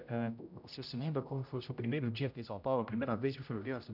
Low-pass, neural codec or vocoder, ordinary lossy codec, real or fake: 5.4 kHz; codec, 16 kHz, 0.5 kbps, X-Codec, HuBERT features, trained on general audio; none; fake